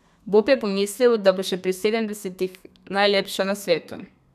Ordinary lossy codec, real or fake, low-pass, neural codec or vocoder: none; fake; 14.4 kHz; codec, 32 kHz, 1.9 kbps, SNAC